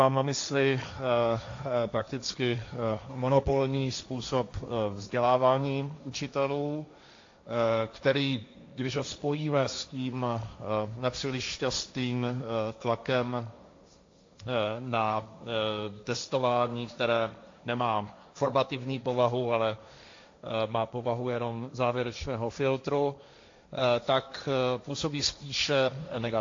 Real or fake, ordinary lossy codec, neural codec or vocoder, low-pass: fake; AAC, 48 kbps; codec, 16 kHz, 1.1 kbps, Voila-Tokenizer; 7.2 kHz